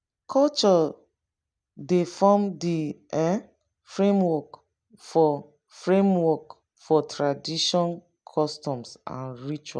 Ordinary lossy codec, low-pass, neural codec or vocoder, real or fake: none; 9.9 kHz; none; real